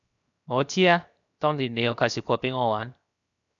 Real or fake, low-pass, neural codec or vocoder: fake; 7.2 kHz; codec, 16 kHz, 0.7 kbps, FocalCodec